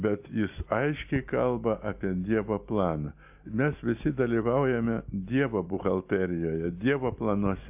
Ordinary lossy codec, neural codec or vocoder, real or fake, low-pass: AAC, 32 kbps; none; real; 3.6 kHz